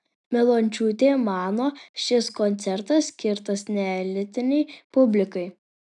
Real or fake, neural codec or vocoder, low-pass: real; none; 10.8 kHz